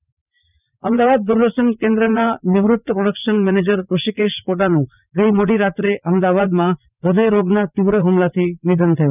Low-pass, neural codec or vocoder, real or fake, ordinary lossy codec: 3.6 kHz; vocoder, 44.1 kHz, 80 mel bands, Vocos; fake; none